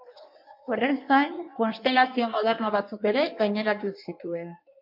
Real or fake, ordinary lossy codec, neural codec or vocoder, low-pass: fake; MP3, 48 kbps; codec, 16 kHz in and 24 kHz out, 1.1 kbps, FireRedTTS-2 codec; 5.4 kHz